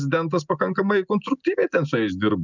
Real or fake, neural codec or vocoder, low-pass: real; none; 7.2 kHz